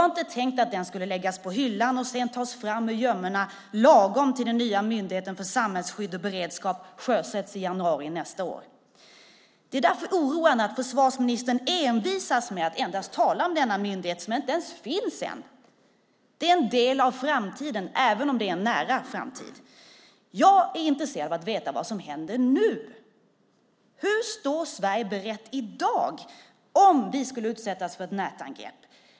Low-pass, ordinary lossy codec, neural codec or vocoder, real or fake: none; none; none; real